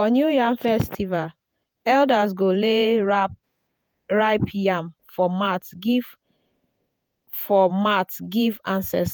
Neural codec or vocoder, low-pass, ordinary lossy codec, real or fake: vocoder, 48 kHz, 128 mel bands, Vocos; none; none; fake